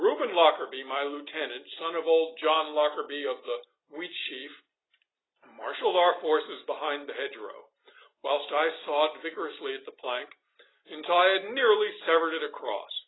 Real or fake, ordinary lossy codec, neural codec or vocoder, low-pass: real; AAC, 16 kbps; none; 7.2 kHz